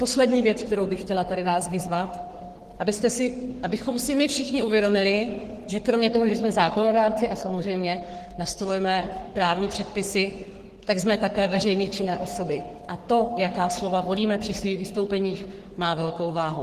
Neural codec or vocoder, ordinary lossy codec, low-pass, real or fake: codec, 44.1 kHz, 3.4 kbps, Pupu-Codec; Opus, 16 kbps; 14.4 kHz; fake